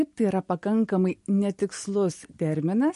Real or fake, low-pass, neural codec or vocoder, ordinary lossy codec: real; 14.4 kHz; none; MP3, 48 kbps